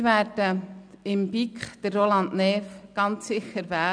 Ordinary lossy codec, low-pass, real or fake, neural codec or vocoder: none; 9.9 kHz; real; none